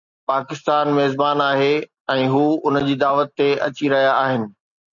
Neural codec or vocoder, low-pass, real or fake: none; 7.2 kHz; real